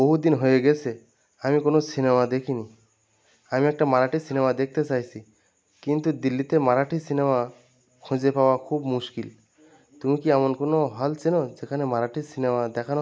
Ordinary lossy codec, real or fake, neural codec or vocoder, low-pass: none; real; none; none